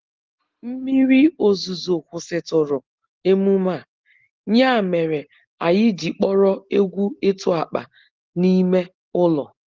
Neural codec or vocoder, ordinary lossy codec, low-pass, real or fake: none; Opus, 16 kbps; 7.2 kHz; real